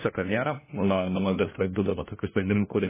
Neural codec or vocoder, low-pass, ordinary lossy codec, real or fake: codec, 16 kHz, 1 kbps, FunCodec, trained on LibriTTS, 50 frames a second; 3.6 kHz; MP3, 16 kbps; fake